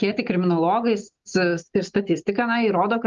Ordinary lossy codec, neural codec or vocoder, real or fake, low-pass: Opus, 32 kbps; none; real; 7.2 kHz